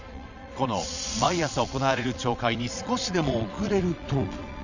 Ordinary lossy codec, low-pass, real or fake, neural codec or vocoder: none; 7.2 kHz; fake; vocoder, 22.05 kHz, 80 mel bands, Vocos